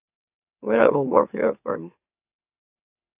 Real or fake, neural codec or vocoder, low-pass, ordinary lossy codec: fake; autoencoder, 44.1 kHz, a latent of 192 numbers a frame, MeloTTS; 3.6 kHz; AAC, 32 kbps